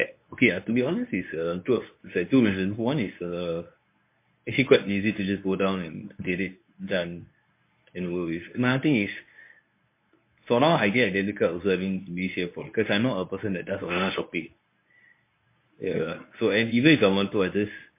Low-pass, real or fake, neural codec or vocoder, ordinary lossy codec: 3.6 kHz; fake; codec, 24 kHz, 0.9 kbps, WavTokenizer, medium speech release version 1; MP3, 24 kbps